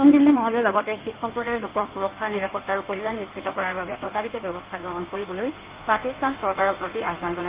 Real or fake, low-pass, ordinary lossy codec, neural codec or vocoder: fake; 3.6 kHz; Opus, 32 kbps; codec, 16 kHz in and 24 kHz out, 1.1 kbps, FireRedTTS-2 codec